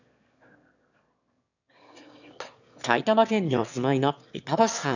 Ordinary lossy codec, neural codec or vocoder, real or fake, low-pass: none; autoencoder, 22.05 kHz, a latent of 192 numbers a frame, VITS, trained on one speaker; fake; 7.2 kHz